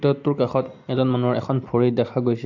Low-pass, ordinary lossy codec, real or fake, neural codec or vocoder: 7.2 kHz; AAC, 48 kbps; real; none